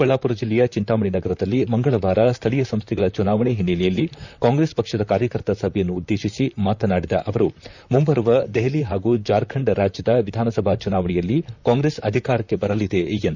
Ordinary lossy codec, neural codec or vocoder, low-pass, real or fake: none; vocoder, 44.1 kHz, 128 mel bands, Pupu-Vocoder; 7.2 kHz; fake